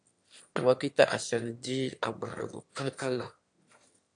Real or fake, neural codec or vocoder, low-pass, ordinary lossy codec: fake; autoencoder, 22.05 kHz, a latent of 192 numbers a frame, VITS, trained on one speaker; 9.9 kHz; MP3, 64 kbps